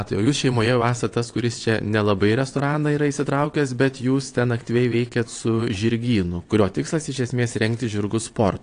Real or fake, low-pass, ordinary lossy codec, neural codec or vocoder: fake; 9.9 kHz; AAC, 48 kbps; vocoder, 22.05 kHz, 80 mel bands, WaveNeXt